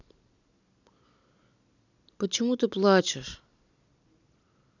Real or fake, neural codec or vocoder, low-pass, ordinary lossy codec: real; none; 7.2 kHz; none